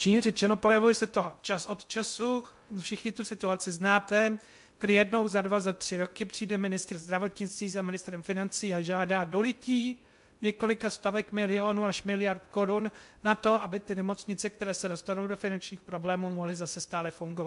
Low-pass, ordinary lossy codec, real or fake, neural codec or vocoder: 10.8 kHz; MP3, 64 kbps; fake; codec, 16 kHz in and 24 kHz out, 0.6 kbps, FocalCodec, streaming, 2048 codes